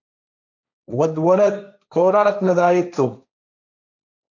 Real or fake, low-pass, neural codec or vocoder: fake; 7.2 kHz; codec, 16 kHz, 1.1 kbps, Voila-Tokenizer